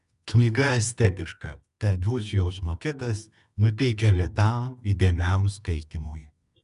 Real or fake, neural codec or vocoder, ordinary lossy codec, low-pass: fake; codec, 24 kHz, 0.9 kbps, WavTokenizer, medium music audio release; MP3, 96 kbps; 10.8 kHz